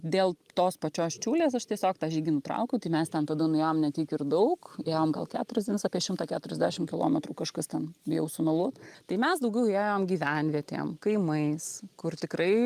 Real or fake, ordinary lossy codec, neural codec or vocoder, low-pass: real; Opus, 32 kbps; none; 14.4 kHz